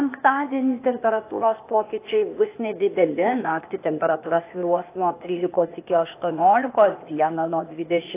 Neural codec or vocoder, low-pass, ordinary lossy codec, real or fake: codec, 16 kHz, 0.8 kbps, ZipCodec; 3.6 kHz; AAC, 24 kbps; fake